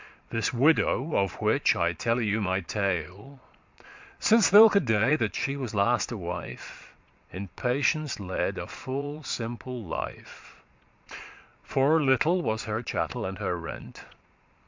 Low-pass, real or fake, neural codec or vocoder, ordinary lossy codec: 7.2 kHz; fake; vocoder, 22.05 kHz, 80 mel bands, Vocos; MP3, 64 kbps